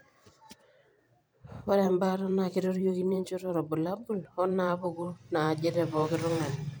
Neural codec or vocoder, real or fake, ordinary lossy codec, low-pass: vocoder, 44.1 kHz, 128 mel bands every 512 samples, BigVGAN v2; fake; none; none